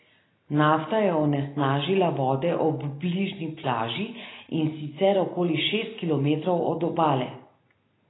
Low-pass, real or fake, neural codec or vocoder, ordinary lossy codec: 7.2 kHz; real; none; AAC, 16 kbps